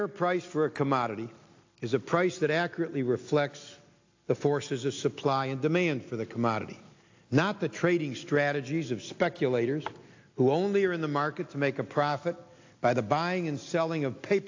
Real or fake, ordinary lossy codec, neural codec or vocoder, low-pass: real; AAC, 48 kbps; none; 7.2 kHz